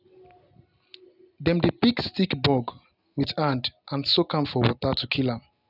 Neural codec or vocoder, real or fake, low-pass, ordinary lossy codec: none; real; 5.4 kHz; none